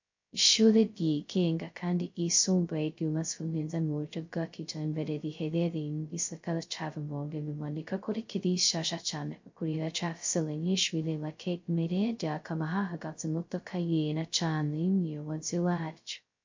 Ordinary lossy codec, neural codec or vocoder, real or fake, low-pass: MP3, 48 kbps; codec, 16 kHz, 0.2 kbps, FocalCodec; fake; 7.2 kHz